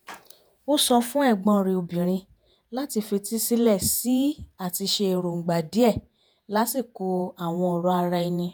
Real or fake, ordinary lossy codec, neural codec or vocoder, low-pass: fake; none; vocoder, 48 kHz, 128 mel bands, Vocos; none